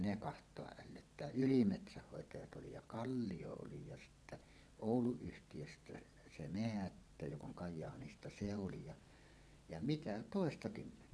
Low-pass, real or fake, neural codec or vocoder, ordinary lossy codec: none; fake; vocoder, 22.05 kHz, 80 mel bands, WaveNeXt; none